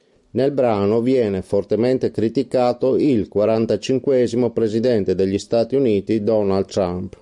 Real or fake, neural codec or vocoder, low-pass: real; none; 10.8 kHz